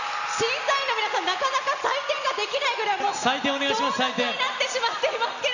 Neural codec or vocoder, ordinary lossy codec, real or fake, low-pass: none; none; real; 7.2 kHz